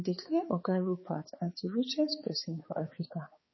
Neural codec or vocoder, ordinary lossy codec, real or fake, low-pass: codec, 16 kHz, 4 kbps, X-Codec, HuBERT features, trained on balanced general audio; MP3, 24 kbps; fake; 7.2 kHz